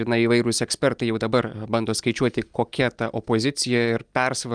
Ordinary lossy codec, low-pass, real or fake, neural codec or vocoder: Opus, 32 kbps; 9.9 kHz; real; none